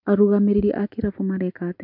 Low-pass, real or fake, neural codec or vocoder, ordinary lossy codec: 5.4 kHz; real; none; MP3, 48 kbps